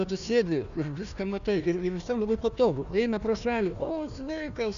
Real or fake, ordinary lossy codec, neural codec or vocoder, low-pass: fake; MP3, 96 kbps; codec, 16 kHz, 1 kbps, FunCodec, trained on Chinese and English, 50 frames a second; 7.2 kHz